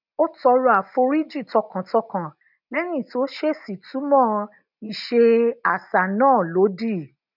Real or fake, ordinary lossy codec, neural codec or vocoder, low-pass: real; none; none; 5.4 kHz